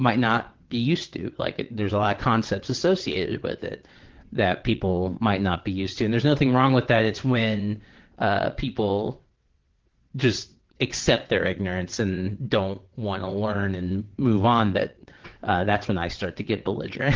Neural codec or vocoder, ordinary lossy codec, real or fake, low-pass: vocoder, 22.05 kHz, 80 mel bands, WaveNeXt; Opus, 16 kbps; fake; 7.2 kHz